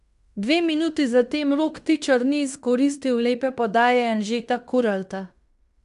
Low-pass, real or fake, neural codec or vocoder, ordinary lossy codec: 10.8 kHz; fake; codec, 16 kHz in and 24 kHz out, 0.9 kbps, LongCat-Audio-Codec, fine tuned four codebook decoder; none